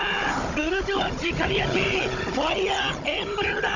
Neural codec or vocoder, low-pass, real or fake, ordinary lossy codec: codec, 16 kHz, 16 kbps, FunCodec, trained on Chinese and English, 50 frames a second; 7.2 kHz; fake; none